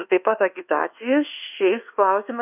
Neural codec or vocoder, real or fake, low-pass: codec, 24 kHz, 1.2 kbps, DualCodec; fake; 3.6 kHz